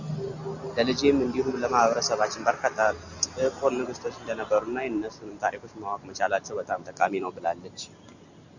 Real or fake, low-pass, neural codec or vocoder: real; 7.2 kHz; none